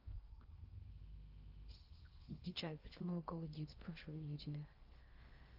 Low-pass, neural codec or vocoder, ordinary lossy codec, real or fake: 5.4 kHz; codec, 16 kHz in and 24 kHz out, 0.6 kbps, FocalCodec, streaming, 4096 codes; Opus, 24 kbps; fake